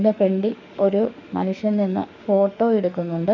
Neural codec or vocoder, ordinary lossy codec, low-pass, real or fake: autoencoder, 48 kHz, 32 numbers a frame, DAC-VAE, trained on Japanese speech; none; 7.2 kHz; fake